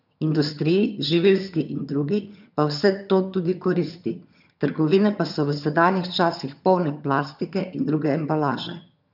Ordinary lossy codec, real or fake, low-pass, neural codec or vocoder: none; fake; 5.4 kHz; vocoder, 22.05 kHz, 80 mel bands, HiFi-GAN